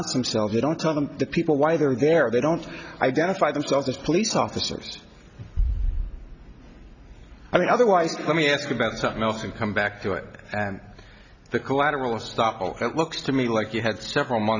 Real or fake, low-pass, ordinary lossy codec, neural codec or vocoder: real; 7.2 kHz; Opus, 64 kbps; none